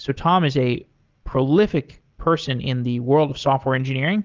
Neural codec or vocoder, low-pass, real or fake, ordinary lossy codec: none; 7.2 kHz; real; Opus, 32 kbps